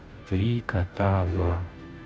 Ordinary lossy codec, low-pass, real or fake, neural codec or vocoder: none; none; fake; codec, 16 kHz, 0.5 kbps, FunCodec, trained on Chinese and English, 25 frames a second